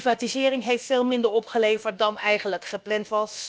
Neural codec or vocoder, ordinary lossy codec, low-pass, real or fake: codec, 16 kHz, about 1 kbps, DyCAST, with the encoder's durations; none; none; fake